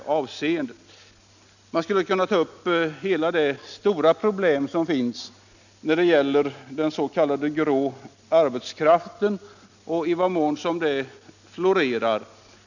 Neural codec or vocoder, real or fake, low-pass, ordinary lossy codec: none; real; 7.2 kHz; none